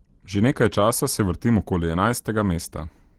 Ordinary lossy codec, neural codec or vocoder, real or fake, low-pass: Opus, 16 kbps; none; real; 19.8 kHz